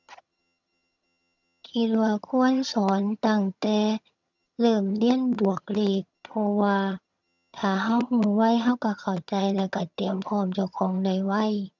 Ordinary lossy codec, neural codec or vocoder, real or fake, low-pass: none; vocoder, 22.05 kHz, 80 mel bands, HiFi-GAN; fake; 7.2 kHz